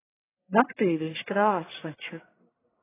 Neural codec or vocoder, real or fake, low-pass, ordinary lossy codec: codec, 44.1 kHz, 1.7 kbps, Pupu-Codec; fake; 3.6 kHz; AAC, 16 kbps